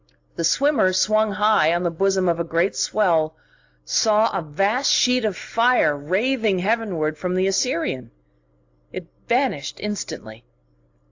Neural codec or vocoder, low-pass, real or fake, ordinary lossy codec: none; 7.2 kHz; real; AAC, 48 kbps